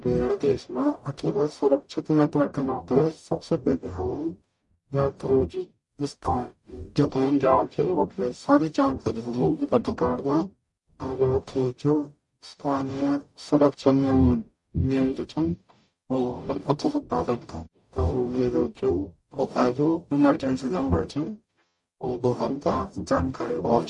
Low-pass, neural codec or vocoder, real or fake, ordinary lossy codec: 10.8 kHz; codec, 44.1 kHz, 0.9 kbps, DAC; fake; MP3, 48 kbps